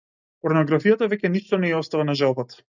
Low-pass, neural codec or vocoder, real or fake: 7.2 kHz; none; real